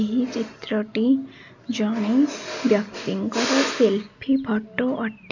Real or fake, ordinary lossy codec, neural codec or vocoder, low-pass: real; none; none; 7.2 kHz